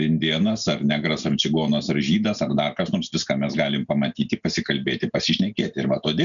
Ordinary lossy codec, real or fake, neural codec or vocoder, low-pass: MP3, 96 kbps; real; none; 7.2 kHz